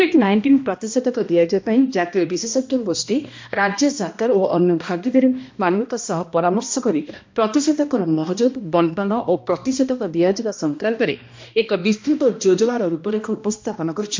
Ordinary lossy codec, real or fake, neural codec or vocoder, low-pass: MP3, 48 kbps; fake; codec, 16 kHz, 1 kbps, X-Codec, HuBERT features, trained on balanced general audio; 7.2 kHz